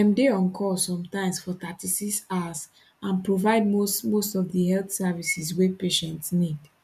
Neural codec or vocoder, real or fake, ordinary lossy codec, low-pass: none; real; none; 14.4 kHz